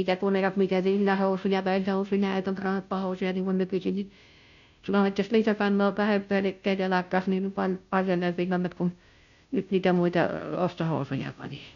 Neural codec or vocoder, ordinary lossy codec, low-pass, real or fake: codec, 16 kHz, 0.5 kbps, FunCodec, trained on Chinese and English, 25 frames a second; none; 7.2 kHz; fake